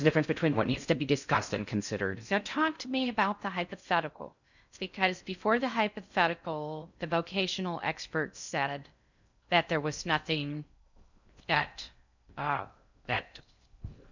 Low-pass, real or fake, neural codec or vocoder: 7.2 kHz; fake; codec, 16 kHz in and 24 kHz out, 0.6 kbps, FocalCodec, streaming, 4096 codes